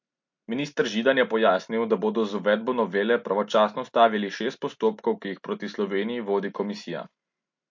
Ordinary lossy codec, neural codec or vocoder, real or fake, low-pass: MP3, 48 kbps; none; real; 7.2 kHz